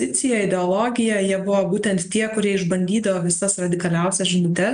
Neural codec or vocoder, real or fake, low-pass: none; real; 9.9 kHz